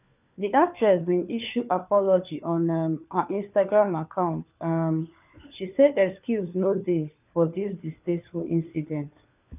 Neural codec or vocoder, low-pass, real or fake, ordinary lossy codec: codec, 16 kHz, 4 kbps, FunCodec, trained on LibriTTS, 50 frames a second; 3.6 kHz; fake; none